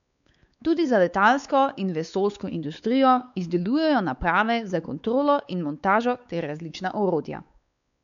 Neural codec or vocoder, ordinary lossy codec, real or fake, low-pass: codec, 16 kHz, 4 kbps, X-Codec, WavLM features, trained on Multilingual LibriSpeech; none; fake; 7.2 kHz